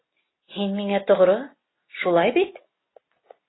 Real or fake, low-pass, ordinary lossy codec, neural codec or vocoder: real; 7.2 kHz; AAC, 16 kbps; none